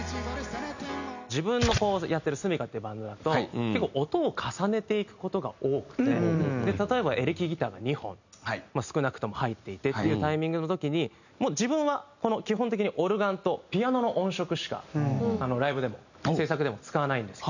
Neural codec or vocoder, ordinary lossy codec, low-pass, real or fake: none; none; 7.2 kHz; real